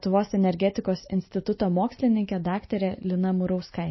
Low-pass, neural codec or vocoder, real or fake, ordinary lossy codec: 7.2 kHz; none; real; MP3, 24 kbps